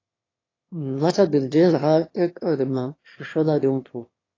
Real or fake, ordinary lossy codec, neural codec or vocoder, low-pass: fake; AAC, 32 kbps; autoencoder, 22.05 kHz, a latent of 192 numbers a frame, VITS, trained on one speaker; 7.2 kHz